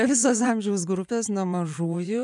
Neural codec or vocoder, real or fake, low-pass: vocoder, 24 kHz, 100 mel bands, Vocos; fake; 10.8 kHz